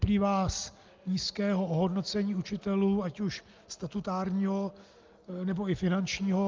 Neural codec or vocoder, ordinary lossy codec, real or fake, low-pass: none; Opus, 32 kbps; real; 7.2 kHz